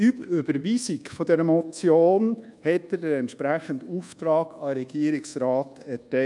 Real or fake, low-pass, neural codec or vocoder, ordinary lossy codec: fake; 10.8 kHz; codec, 24 kHz, 1.2 kbps, DualCodec; none